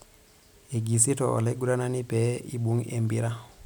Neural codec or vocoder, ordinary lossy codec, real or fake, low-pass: none; none; real; none